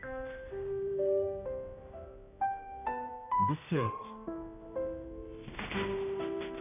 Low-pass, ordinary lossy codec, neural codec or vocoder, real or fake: 3.6 kHz; none; codec, 16 kHz, 1 kbps, X-Codec, HuBERT features, trained on general audio; fake